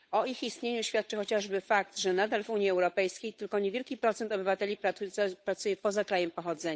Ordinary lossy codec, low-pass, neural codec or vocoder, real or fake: none; none; codec, 16 kHz, 8 kbps, FunCodec, trained on Chinese and English, 25 frames a second; fake